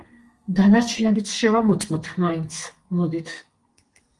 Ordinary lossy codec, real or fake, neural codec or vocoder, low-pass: Opus, 24 kbps; fake; codec, 32 kHz, 1.9 kbps, SNAC; 10.8 kHz